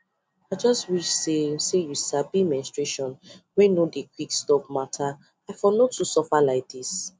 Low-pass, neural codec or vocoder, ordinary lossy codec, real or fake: none; none; none; real